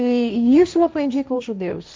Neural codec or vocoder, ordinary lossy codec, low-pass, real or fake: codec, 16 kHz, 1.1 kbps, Voila-Tokenizer; none; none; fake